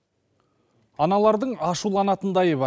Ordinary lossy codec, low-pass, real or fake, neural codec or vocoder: none; none; real; none